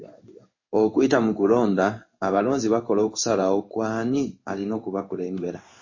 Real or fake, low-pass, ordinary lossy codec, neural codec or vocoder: fake; 7.2 kHz; MP3, 32 kbps; codec, 16 kHz in and 24 kHz out, 1 kbps, XY-Tokenizer